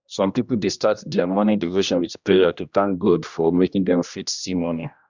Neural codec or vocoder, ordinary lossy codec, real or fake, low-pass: codec, 16 kHz, 1 kbps, X-Codec, HuBERT features, trained on general audio; Opus, 64 kbps; fake; 7.2 kHz